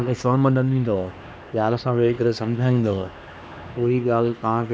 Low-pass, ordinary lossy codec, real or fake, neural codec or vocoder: none; none; fake; codec, 16 kHz, 2 kbps, X-Codec, HuBERT features, trained on LibriSpeech